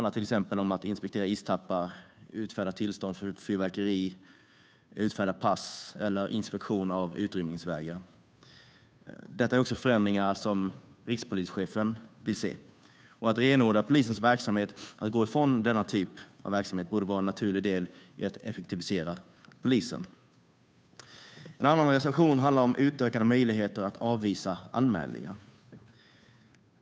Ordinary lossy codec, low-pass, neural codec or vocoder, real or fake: none; none; codec, 16 kHz, 2 kbps, FunCodec, trained on Chinese and English, 25 frames a second; fake